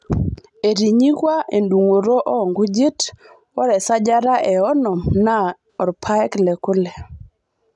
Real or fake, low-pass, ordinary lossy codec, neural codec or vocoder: real; 10.8 kHz; none; none